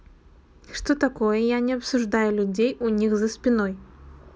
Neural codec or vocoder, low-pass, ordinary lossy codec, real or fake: none; none; none; real